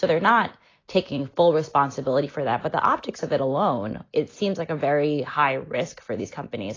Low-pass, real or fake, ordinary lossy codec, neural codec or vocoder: 7.2 kHz; real; AAC, 32 kbps; none